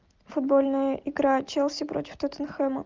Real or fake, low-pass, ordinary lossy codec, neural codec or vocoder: real; 7.2 kHz; Opus, 24 kbps; none